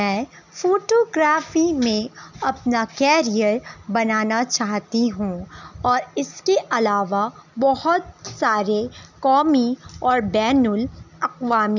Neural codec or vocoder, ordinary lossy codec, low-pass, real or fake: none; none; 7.2 kHz; real